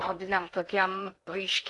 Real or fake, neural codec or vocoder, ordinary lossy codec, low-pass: fake; codec, 16 kHz in and 24 kHz out, 0.8 kbps, FocalCodec, streaming, 65536 codes; AAC, 64 kbps; 10.8 kHz